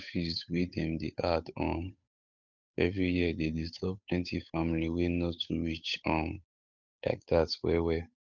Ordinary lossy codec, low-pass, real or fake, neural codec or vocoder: none; 7.2 kHz; fake; codec, 16 kHz, 8 kbps, FunCodec, trained on Chinese and English, 25 frames a second